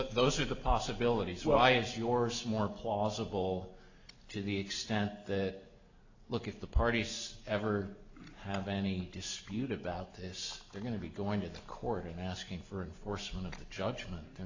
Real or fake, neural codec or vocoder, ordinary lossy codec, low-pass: real; none; AAC, 48 kbps; 7.2 kHz